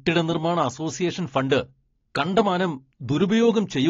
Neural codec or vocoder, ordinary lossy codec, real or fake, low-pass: none; AAC, 32 kbps; real; 7.2 kHz